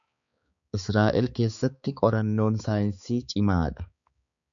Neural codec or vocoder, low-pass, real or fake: codec, 16 kHz, 4 kbps, X-Codec, HuBERT features, trained on balanced general audio; 7.2 kHz; fake